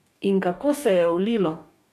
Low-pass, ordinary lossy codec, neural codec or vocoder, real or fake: 14.4 kHz; none; codec, 44.1 kHz, 2.6 kbps, DAC; fake